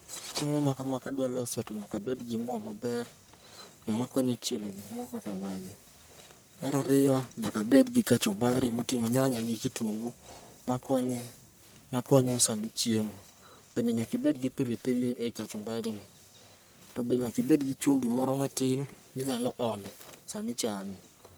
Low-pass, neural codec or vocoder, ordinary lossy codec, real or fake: none; codec, 44.1 kHz, 1.7 kbps, Pupu-Codec; none; fake